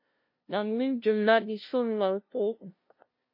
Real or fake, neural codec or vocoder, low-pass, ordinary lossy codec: fake; codec, 16 kHz, 0.5 kbps, FunCodec, trained on LibriTTS, 25 frames a second; 5.4 kHz; MP3, 32 kbps